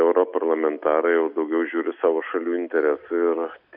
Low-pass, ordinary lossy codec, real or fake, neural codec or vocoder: 5.4 kHz; MP3, 48 kbps; real; none